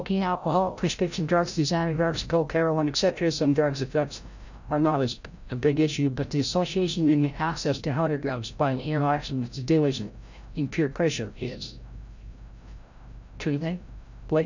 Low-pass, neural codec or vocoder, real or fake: 7.2 kHz; codec, 16 kHz, 0.5 kbps, FreqCodec, larger model; fake